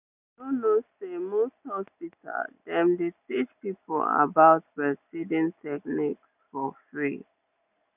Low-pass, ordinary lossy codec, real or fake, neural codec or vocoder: 3.6 kHz; none; real; none